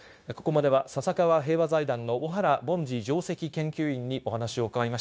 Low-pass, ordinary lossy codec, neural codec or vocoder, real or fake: none; none; codec, 16 kHz, 0.9 kbps, LongCat-Audio-Codec; fake